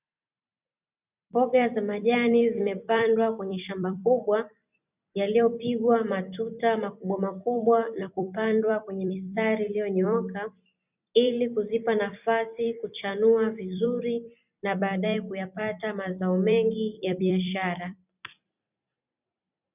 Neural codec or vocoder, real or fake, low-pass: vocoder, 44.1 kHz, 128 mel bands every 256 samples, BigVGAN v2; fake; 3.6 kHz